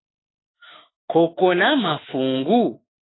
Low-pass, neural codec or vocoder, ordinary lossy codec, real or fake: 7.2 kHz; autoencoder, 48 kHz, 32 numbers a frame, DAC-VAE, trained on Japanese speech; AAC, 16 kbps; fake